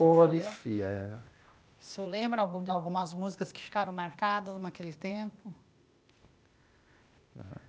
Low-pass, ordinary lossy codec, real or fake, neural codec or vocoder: none; none; fake; codec, 16 kHz, 0.8 kbps, ZipCodec